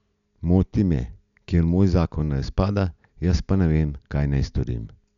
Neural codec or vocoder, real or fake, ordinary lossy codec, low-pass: none; real; none; 7.2 kHz